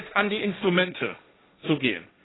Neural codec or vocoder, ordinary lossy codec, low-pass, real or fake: codec, 16 kHz, 1.1 kbps, Voila-Tokenizer; AAC, 16 kbps; 7.2 kHz; fake